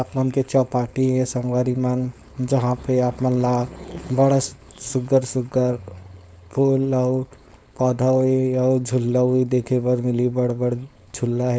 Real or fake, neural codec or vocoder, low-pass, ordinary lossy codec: fake; codec, 16 kHz, 4.8 kbps, FACodec; none; none